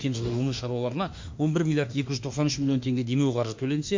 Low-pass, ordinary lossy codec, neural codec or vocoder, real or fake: 7.2 kHz; MP3, 48 kbps; autoencoder, 48 kHz, 32 numbers a frame, DAC-VAE, trained on Japanese speech; fake